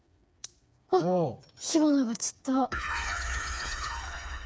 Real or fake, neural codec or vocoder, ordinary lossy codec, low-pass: fake; codec, 16 kHz, 4 kbps, FreqCodec, smaller model; none; none